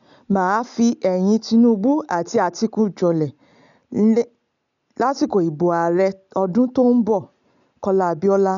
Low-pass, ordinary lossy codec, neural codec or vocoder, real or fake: 7.2 kHz; none; none; real